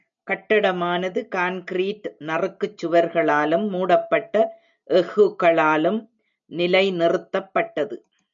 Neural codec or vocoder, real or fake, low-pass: none; real; 7.2 kHz